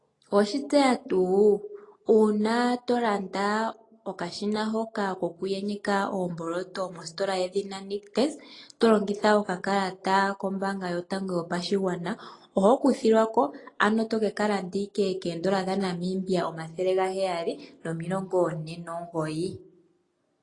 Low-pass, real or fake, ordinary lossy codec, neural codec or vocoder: 10.8 kHz; real; AAC, 32 kbps; none